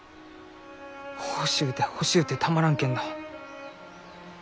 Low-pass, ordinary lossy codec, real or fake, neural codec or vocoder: none; none; real; none